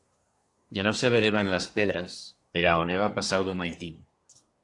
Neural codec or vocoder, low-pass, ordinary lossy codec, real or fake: codec, 24 kHz, 1 kbps, SNAC; 10.8 kHz; AAC, 48 kbps; fake